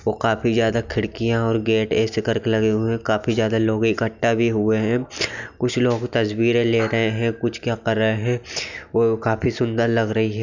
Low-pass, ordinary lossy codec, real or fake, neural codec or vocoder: 7.2 kHz; none; real; none